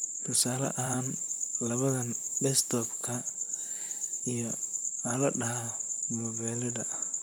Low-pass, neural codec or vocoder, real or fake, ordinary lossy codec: none; vocoder, 44.1 kHz, 128 mel bands, Pupu-Vocoder; fake; none